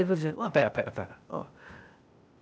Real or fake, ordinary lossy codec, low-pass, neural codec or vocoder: fake; none; none; codec, 16 kHz, 0.8 kbps, ZipCodec